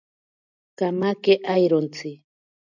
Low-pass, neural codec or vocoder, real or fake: 7.2 kHz; none; real